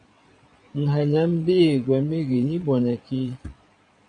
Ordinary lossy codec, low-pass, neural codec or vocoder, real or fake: MP3, 96 kbps; 9.9 kHz; vocoder, 22.05 kHz, 80 mel bands, Vocos; fake